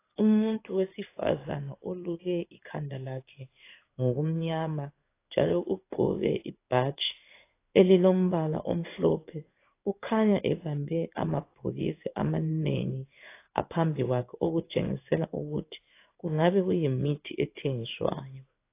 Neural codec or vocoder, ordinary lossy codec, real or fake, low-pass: codec, 16 kHz in and 24 kHz out, 1 kbps, XY-Tokenizer; AAC, 24 kbps; fake; 3.6 kHz